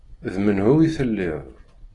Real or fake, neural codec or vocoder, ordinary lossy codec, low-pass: real; none; AAC, 32 kbps; 10.8 kHz